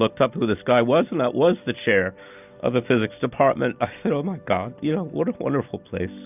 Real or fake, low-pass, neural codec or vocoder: real; 3.6 kHz; none